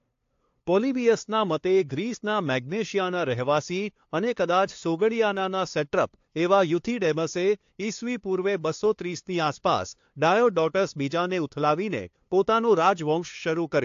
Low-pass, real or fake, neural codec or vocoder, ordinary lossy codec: 7.2 kHz; fake; codec, 16 kHz, 2 kbps, FunCodec, trained on LibriTTS, 25 frames a second; AAC, 48 kbps